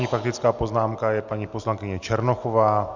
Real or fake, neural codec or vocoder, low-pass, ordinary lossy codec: real; none; 7.2 kHz; Opus, 64 kbps